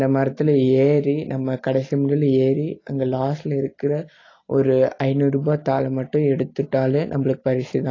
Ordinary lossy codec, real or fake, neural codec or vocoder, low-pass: AAC, 32 kbps; real; none; 7.2 kHz